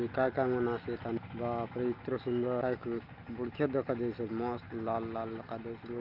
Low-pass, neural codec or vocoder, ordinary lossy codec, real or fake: 5.4 kHz; none; Opus, 24 kbps; real